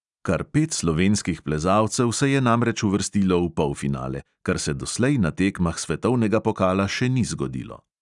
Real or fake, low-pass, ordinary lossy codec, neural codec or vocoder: real; 10.8 kHz; none; none